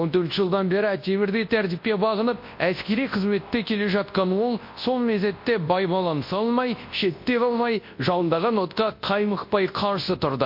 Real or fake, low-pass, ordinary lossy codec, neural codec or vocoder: fake; 5.4 kHz; MP3, 32 kbps; codec, 24 kHz, 0.9 kbps, WavTokenizer, large speech release